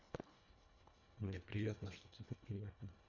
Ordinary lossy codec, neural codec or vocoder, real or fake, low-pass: MP3, 64 kbps; codec, 24 kHz, 1.5 kbps, HILCodec; fake; 7.2 kHz